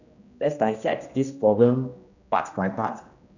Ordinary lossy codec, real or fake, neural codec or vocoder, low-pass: none; fake; codec, 16 kHz, 1 kbps, X-Codec, HuBERT features, trained on general audio; 7.2 kHz